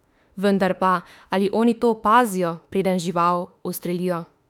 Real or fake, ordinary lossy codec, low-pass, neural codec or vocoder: fake; none; 19.8 kHz; autoencoder, 48 kHz, 32 numbers a frame, DAC-VAE, trained on Japanese speech